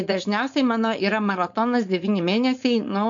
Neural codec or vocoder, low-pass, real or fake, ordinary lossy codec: codec, 16 kHz, 4.8 kbps, FACodec; 7.2 kHz; fake; MP3, 64 kbps